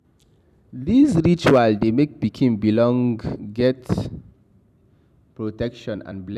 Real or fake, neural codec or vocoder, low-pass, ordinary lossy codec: fake; vocoder, 44.1 kHz, 128 mel bands every 256 samples, BigVGAN v2; 14.4 kHz; none